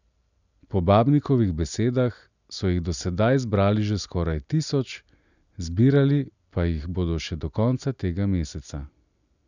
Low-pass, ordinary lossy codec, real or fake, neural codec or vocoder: 7.2 kHz; none; real; none